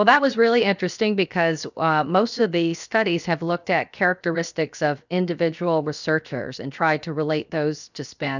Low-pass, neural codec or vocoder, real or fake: 7.2 kHz; codec, 16 kHz, 0.7 kbps, FocalCodec; fake